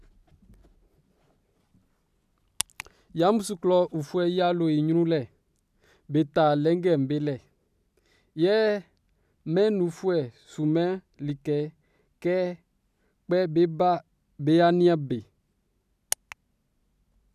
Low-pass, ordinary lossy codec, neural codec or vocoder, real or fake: 14.4 kHz; none; none; real